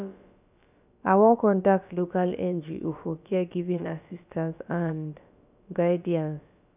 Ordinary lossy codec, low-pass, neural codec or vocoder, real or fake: none; 3.6 kHz; codec, 16 kHz, about 1 kbps, DyCAST, with the encoder's durations; fake